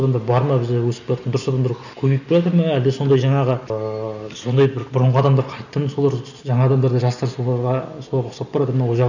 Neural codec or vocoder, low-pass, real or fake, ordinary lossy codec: none; 7.2 kHz; real; none